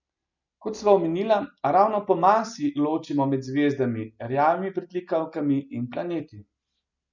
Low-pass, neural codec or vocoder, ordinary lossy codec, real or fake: 7.2 kHz; none; none; real